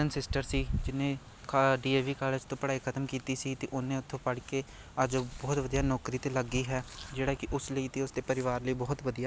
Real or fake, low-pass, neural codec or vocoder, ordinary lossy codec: real; none; none; none